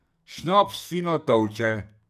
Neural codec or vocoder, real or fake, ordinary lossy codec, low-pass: codec, 44.1 kHz, 2.6 kbps, SNAC; fake; none; 14.4 kHz